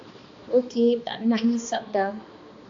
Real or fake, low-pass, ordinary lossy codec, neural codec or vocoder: fake; 7.2 kHz; AAC, 48 kbps; codec, 16 kHz, 2 kbps, X-Codec, HuBERT features, trained on balanced general audio